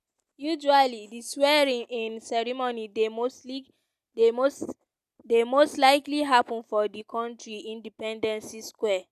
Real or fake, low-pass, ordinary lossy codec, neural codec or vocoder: real; 14.4 kHz; none; none